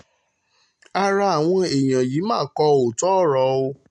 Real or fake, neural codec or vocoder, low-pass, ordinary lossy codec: real; none; 10.8 kHz; MP3, 64 kbps